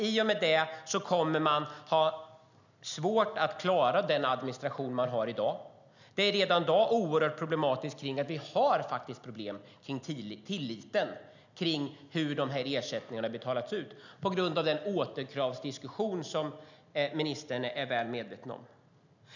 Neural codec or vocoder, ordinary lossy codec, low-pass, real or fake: none; none; 7.2 kHz; real